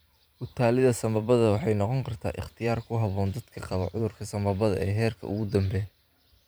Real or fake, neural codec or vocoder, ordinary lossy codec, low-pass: fake; vocoder, 44.1 kHz, 128 mel bands every 512 samples, BigVGAN v2; none; none